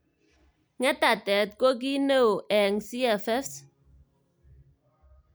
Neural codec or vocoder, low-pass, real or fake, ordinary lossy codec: none; none; real; none